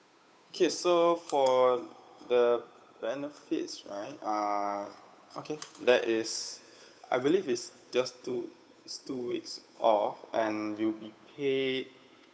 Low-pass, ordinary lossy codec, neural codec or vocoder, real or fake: none; none; codec, 16 kHz, 8 kbps, FunCodec, trained on Chinese and English, 25 frames a second; fake